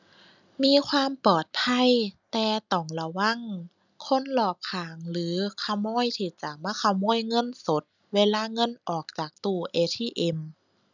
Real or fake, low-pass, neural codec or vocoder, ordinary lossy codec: real; 7.2 kHz; none; none